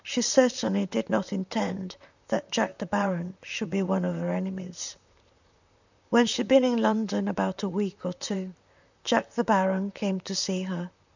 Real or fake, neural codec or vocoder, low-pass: fake; vocoder, 44.1 kHz, 128 mel bands, Pupu-Vocoder; 7.2 kHz